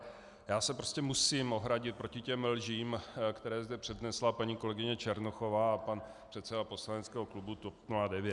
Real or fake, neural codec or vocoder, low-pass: real; none; 10.8 kHz